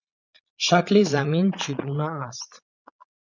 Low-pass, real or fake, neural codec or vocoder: 7.2 kHz; fake; vocoder, 22.05 kHz, 80 mel bands, Vocos